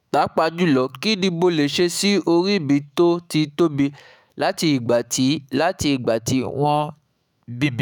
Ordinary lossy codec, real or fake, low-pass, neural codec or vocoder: none; fake; none; autoencoder, 48 kHz, 128 numbers a frame, DAC-VAE, trained on Japanese speech